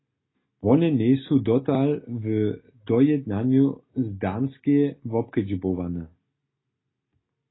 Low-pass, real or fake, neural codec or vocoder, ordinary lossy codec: 7.2 kHz; real; none; AAC, 16 kbps